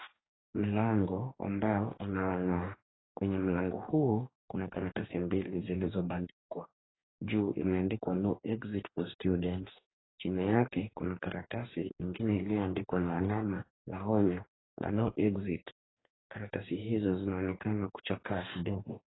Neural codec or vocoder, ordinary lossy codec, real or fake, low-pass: codec, 44.1 kHz, 2.6 kbps, DAC; AAC, 16 kbps; fake; 7.2 kHz